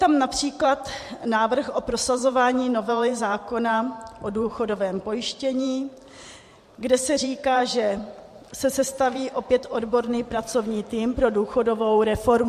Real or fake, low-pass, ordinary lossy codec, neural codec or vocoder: fake; 14.4 kHz; MP3, 64 kbps; vocoder, 44.1 kHz, 128 mel bands every 512 samples, BigVGAN v2